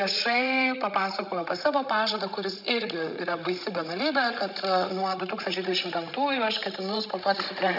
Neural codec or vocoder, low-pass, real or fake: codec, 16 kHz, 16 kbps, FreqCodec, larger model; 5.4 kHz; fake